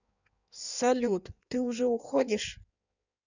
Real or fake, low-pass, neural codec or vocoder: fake; 7.2 kHz; codec, 16 kHz in and 24 kHz out, 1.1 kbps, FireRedTTS-2 codec